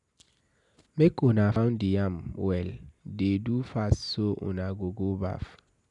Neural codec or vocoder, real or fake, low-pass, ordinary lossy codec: none; real; 10.8 kHz; none